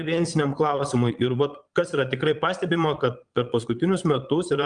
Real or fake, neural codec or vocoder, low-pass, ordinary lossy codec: fake; vocoder, 22.05 kHz, 80 mel bands, Vocos; 9.9 kHz; Opus, 32 kbps